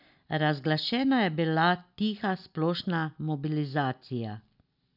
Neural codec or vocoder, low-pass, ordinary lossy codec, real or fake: none; 5.4 kHz; none; real